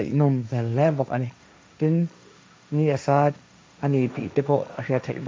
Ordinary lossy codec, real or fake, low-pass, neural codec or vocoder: none; fake; none; codec, 16 kHz, 1.1 kbps, Voila-Tokenizer